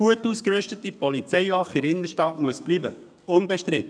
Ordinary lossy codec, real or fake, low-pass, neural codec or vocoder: none; fake; 9.9 kHz; codec, 32 kHz, 1.9 kbps, SNAC